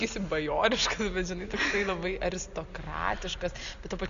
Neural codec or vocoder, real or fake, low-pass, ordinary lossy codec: none; real; 7.2 kHz; Opus, 64 kbps